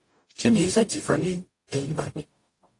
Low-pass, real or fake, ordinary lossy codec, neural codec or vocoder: 10.8 kHz; fake; AAC, 48 kbps; codec, 44.1 kHz, 0.9 kbps, DAC